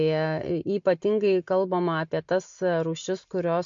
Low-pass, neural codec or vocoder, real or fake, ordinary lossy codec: 7.2 kHz; none; real; MP3, 48 kbps